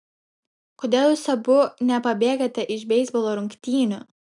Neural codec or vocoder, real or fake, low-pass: none; real; 10.8 kHz